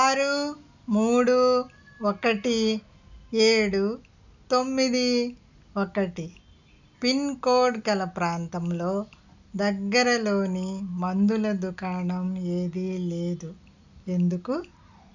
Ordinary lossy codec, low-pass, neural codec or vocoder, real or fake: none; 7.2 kHz; none; real